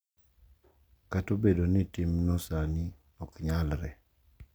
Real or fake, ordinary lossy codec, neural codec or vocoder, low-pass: real; none; none; none